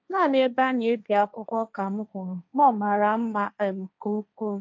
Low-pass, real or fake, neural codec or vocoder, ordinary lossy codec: none; fake; codec, 16 kHz, 1.1 kbps, Voila-Tokenizer; none